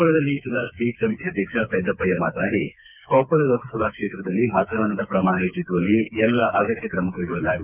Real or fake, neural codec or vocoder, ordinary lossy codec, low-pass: fake; codec, 16 kHz, 4 kbps, FreqCodec, smaller model; none; 3.6 kHz